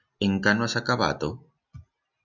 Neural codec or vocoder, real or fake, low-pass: none; real; 7.2 kHz